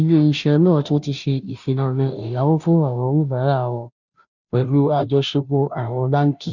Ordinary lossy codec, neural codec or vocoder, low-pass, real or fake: none; codec, 16 kHz, 0.5 kbps, FunCodec, trained on Chinese and English, 25 frames a second; 7.2 kHz; fake